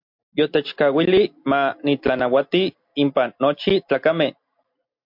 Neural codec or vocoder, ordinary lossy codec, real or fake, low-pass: none; MP3, 48 kbps; real; 5.4 kHz